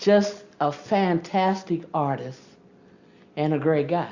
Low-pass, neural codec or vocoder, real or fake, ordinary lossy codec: 7.2 kHz; none; real; Opus, 64 kbps